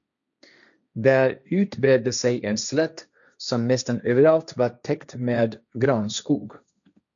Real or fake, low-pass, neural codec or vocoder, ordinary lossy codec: fake; 7.2 kHz; codec, 16 kHz, 1.1 kbps, Voila-Tokenizer; MP3, 96 kbps